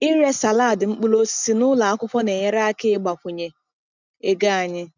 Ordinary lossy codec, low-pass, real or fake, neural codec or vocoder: none; 7.2 kHz; real; none